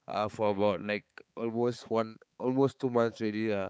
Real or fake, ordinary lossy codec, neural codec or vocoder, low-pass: fake; none; codec, 16 kHz, 4 kbps, X-Codec, HuBERT features, trained on balanced general audio; none